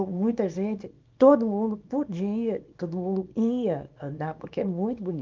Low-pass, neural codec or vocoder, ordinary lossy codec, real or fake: 7.2 kHz; codec, 24 kHz, 0.9 kbps, WavTokenizer, small release; Opus, 24 kbps; fake